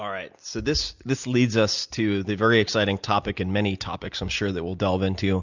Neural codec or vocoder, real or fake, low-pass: none; real; 7.2 kHz